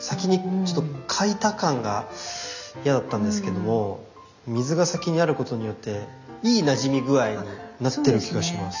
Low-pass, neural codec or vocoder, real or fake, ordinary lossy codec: 7.2 kHz; none; real; none